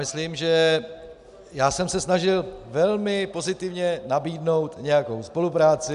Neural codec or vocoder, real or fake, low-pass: none; real; 10.8 kHz